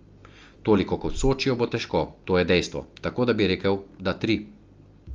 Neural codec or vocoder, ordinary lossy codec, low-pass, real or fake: none; Opus, 32 kbps; 7.2 kHz; real